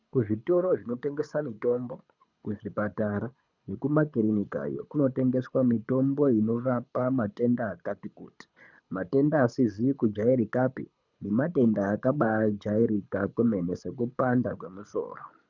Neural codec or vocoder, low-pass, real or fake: codec, 24 kHz, 6 kbps, HILCodec; 7.2 kHz; fake